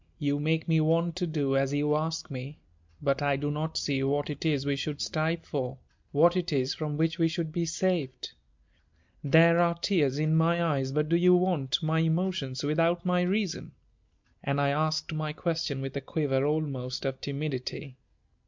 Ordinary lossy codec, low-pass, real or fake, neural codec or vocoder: MP3, 64 kbps; 7.2 kHz; real; none